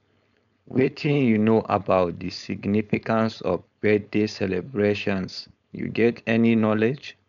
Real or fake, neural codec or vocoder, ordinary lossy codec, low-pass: fake; codec, 16 kHz, 4.8 kbps, FACodec; none; 7.2 kHz